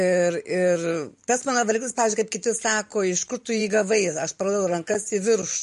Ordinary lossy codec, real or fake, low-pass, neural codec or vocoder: MP3, 48 kbps; fake; 14.4 kHz; vocoder, 44.1 kHz, 128 mel bands every 512 samples, BigVGAN v2